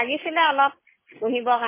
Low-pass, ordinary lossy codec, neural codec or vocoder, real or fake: 3.6 kHz; MP3, 16 kbps; none; real